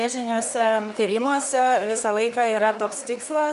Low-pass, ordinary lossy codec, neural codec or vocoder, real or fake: 10.8 kHz; MP3, 96 kbps; codec, 24 kHz, 1 kbps, SNAC; fake